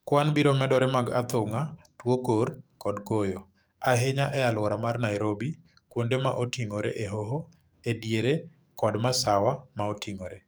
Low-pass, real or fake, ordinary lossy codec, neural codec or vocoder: none; fake; none; codec, 44.1 kHz, 7.8 kbps, DAC